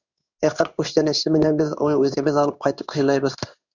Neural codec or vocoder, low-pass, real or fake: codec, 16 kHz in and 24 kHz out, 1 kbps, XY-Tokenizer; 7.2 kHz; fake